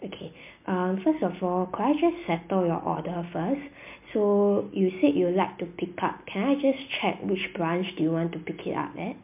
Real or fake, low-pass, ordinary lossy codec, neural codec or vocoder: real; 3.6 kHz; MP3, 32 kbps; none